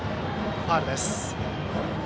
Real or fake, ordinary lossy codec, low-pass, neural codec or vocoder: real; none; none; none